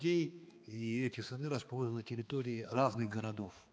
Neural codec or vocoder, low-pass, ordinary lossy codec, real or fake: codec, 16 kHz, 2 kbps, X-Codec, HuBERT features, trained on balanced general audio; none; none; fake